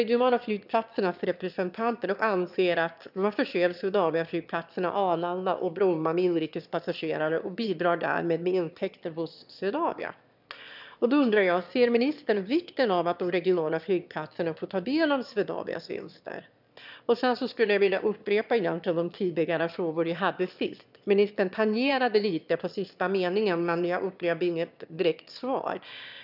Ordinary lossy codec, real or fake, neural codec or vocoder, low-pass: none; fake; autoencoder, 22.05 kHz, a latent of 192 numbers a frame, VITS, trained on one speaker; 5.4 kHz